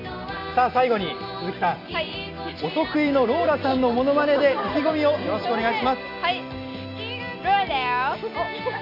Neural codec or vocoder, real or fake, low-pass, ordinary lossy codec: none; real; 5.4 kHz; MP3, 48 kbps